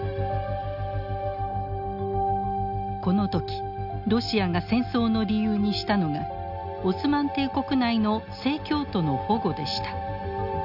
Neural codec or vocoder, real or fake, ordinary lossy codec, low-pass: none; real; none; 5.4 kHz